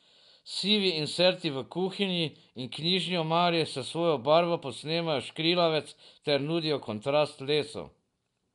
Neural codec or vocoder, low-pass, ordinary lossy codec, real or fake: none; 10.8 kHz; none; real